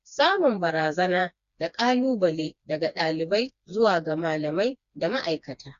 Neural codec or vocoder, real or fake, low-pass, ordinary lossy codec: codec, 16 kHz, 2 kbps, FreqCodec, smaller model; fake; 7.2 kHz; Opus, 64 kbps